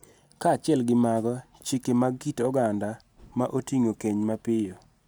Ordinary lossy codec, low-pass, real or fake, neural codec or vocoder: none; none; real; none